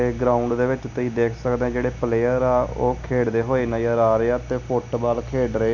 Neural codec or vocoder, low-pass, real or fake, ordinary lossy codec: none; 7.2 kHz; real; none